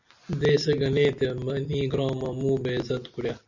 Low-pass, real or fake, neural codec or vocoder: 7.2 kHz; real; none